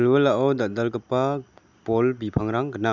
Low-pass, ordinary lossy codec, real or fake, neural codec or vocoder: 7.2 kHz; none; real; none